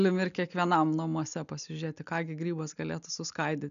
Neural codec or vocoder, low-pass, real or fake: none; 7.2 kHz; real